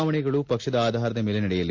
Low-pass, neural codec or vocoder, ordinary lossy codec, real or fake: 7.2 kHz; none; MP3, 64 kbps; real